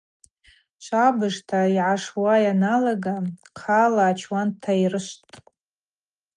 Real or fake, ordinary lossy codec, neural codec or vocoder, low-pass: real; Opus, 32 kbps; none; 9.9 kHz